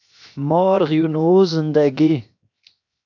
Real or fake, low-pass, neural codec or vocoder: fake; 7.2 kHz; codec, 16 kHz, 0.7 kbps, FocalCodec